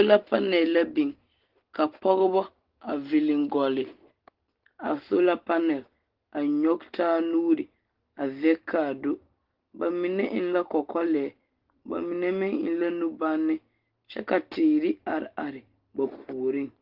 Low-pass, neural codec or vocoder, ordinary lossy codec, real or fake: 5.4 kHz; none; Opus, 16 kbps; real